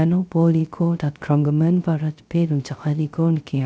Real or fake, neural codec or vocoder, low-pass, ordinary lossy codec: fake; codec, 16 kHz, 0.3 kbps, FocalCodec; none; none